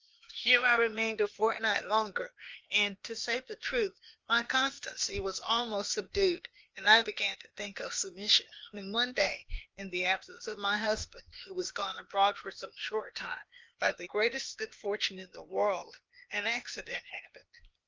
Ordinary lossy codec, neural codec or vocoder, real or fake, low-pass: Opus, 32 kbps; codec, 16 kHz, 0.8 kbps, ZipCodec; fake; 7.2 kHz